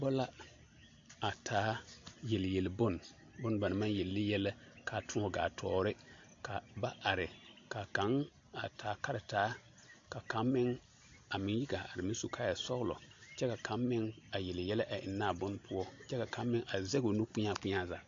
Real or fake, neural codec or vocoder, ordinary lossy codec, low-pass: real; none; AAC, 48 kbps; 7.2 kHz